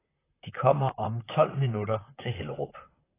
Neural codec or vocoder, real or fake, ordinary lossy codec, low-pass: vocoder, 44.1 kHz, 128 mel bands, Pupu-Vocoder; fake; AAC, 16 kbps; 3.6 kHz